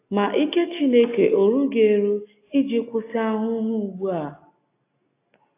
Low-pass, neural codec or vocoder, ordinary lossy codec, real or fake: 3.6 kHz; none; AAC, 24 kbps; real